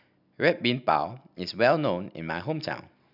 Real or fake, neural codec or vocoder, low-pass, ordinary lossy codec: real; none; 5.4 kHz; none